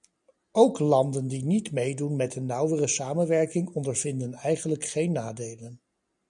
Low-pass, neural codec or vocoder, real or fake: 10.8 kHz; none; real